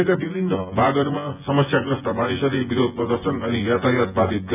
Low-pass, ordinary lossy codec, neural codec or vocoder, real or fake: 3.6 kHz; none; vocoder, 24 kHz, 100 mel bands, Vocos; fake